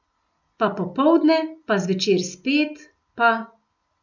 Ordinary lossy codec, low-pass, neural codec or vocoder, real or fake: none; 7.2 kHz; none; real